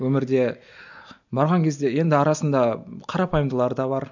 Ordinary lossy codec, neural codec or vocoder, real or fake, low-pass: none; none; real; 7.2 kHz